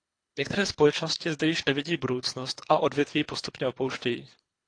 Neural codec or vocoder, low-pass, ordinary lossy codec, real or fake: codec, 24 kHz, 3 kbps, HILCodec; 9.9 kHz; AAC, 48 kbps; fake